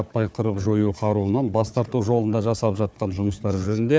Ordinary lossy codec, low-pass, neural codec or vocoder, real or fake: none; none; codec, 16 kHz, 4 kbps, FunCodec, trained on Chinese and English, 50 frames a second; fake